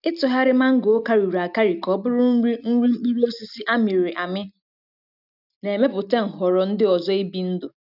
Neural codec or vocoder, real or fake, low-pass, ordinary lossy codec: none; real; 5.4 kHz; none